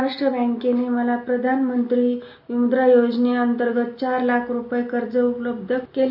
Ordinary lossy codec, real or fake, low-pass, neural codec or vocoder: none; real; 5.4 kHz; none